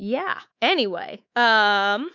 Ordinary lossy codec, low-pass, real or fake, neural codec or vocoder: MP3, 64 kbps; 7.2 kHz; fake; codec, 24 kHz, 3.1 kbps, DualCodec